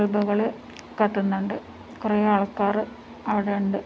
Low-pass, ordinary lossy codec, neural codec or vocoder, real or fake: none; none; none; real